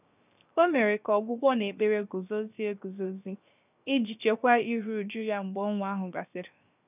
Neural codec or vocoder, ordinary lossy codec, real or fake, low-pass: codec, 16 kHz, 0.7 kbps, FocalCodec; none; fake; 3.6 kHz